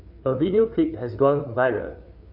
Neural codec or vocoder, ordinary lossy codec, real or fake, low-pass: codec, 16 kHz, 4 kbps, FreqCodec, larger model; none; fake; 5.4 kHz